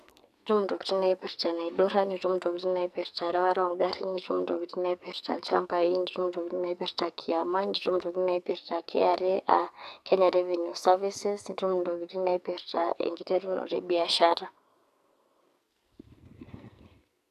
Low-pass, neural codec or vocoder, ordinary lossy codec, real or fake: 14.4 kHz; codec, 44.1 kHz, 2.6 kbps, SNAC; none; fake